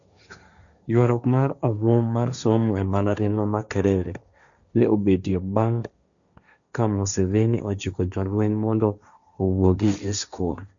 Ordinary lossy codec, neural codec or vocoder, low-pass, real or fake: none; codec, 16 kHz, 1.1 kbps, Voila-Tokenizer; 7.2 kHz; fake